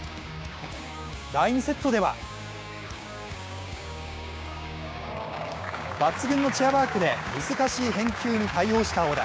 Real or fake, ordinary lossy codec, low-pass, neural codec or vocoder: fake; none; none; codec, 16 kHz, 6 kbps, DAC